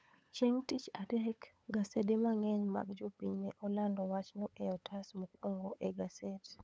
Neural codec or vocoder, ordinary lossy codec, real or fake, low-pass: codec, 16 kHz, 8 kbps, FunCodec, trained on LibriTTS, 25 frames a second; none; fake; none